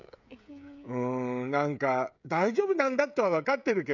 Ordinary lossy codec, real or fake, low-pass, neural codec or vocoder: none; fake; 7.2 kHz; codec, 16 kHz, 16 kbps, FreqCodec, smaller model